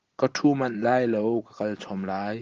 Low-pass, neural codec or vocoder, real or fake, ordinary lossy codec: 7.2 kHz; none; real; Opus, 16 kbps